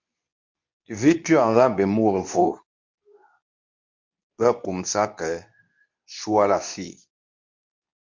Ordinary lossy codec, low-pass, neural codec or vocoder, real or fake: MP3, 48 kbps; 7.2 kHz; codec, 24 kHz, 0.9 kbps, WavTokenizer, medium speech release version 2; fake